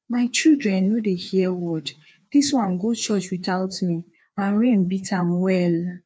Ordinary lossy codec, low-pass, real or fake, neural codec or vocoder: none; none; fake; codec, 16 kHz, 2 kbps, FreqCodec, larger model